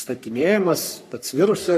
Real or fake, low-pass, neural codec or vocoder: fake; 14.4 kHz; codec, 44.1 kHz, 3.4 kbps, Pupu-Codec